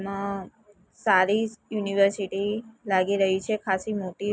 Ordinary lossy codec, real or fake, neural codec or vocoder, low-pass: none; real; none; none